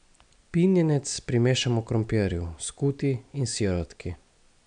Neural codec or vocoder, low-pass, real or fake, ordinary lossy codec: none; 9.9 kHz; real; none